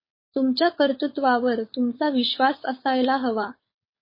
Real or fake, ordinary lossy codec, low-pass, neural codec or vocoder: fake; MP3, 24 kbps; 5.4 kHz; codec, 16 kHz, 4.8 kbps, FACodec